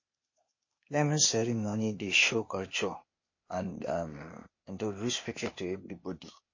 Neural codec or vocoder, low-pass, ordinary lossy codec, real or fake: codec, 16 kHz, 0.8 kbps, ZipCodec; 7.2 kHz; MP3, 32 kbps; fake